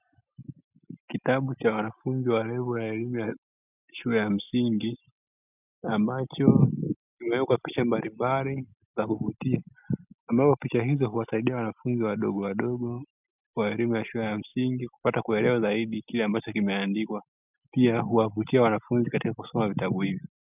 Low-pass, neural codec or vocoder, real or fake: 3.6 kHz; none; real